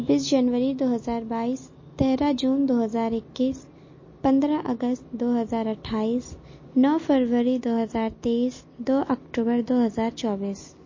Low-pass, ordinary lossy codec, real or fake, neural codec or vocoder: 7.2 kHz; MP3, 32 kbps; real; none